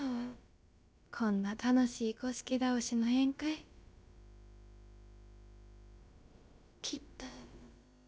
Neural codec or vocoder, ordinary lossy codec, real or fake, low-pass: codec, 16 kHz, about 1 kbps, DyCAST, with the encoder's durations; none; fake; none